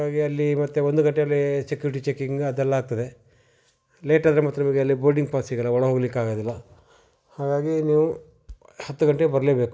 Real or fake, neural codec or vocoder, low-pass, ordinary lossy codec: real; none; none; none